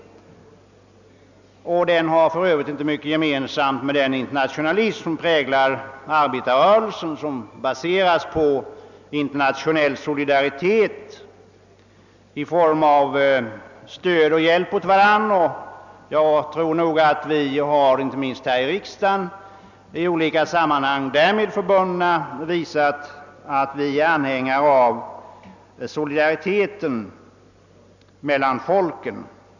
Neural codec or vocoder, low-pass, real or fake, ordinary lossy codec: none; 7.2 kHz; real; none